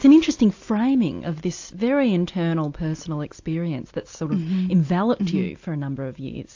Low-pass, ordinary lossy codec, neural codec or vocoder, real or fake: 7.2 kHz; AAC, 48 kbps; none; real